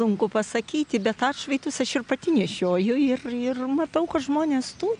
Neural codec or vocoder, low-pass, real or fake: none; 9.9 kHz; real